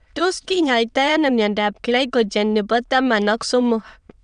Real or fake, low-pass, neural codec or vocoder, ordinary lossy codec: fake; 9.9 kHz; autoencoder, 22.05 kHz, a latent of 192 numbers a frame, VITS, trained on many speakers; none